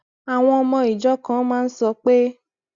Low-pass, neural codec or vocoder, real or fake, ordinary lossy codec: 7.2 kHz; none; real; Opus, 64 kbps